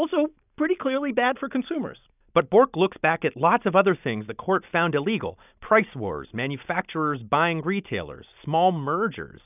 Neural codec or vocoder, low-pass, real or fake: none; 3.6 kHz; real